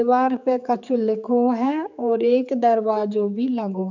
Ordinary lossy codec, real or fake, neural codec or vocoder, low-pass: none; fake; codec, 16 kHz, 4 kbps, X-Codec, HuBERT features, trained on general audio; 7.2 kHz